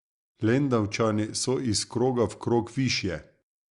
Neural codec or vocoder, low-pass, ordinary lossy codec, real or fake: none; 10.8 kHz; Opus, 64 kbps; real